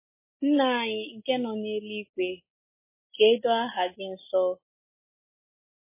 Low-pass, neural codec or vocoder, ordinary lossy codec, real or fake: 3.6 kHz; none; MP3, 16 kbps; real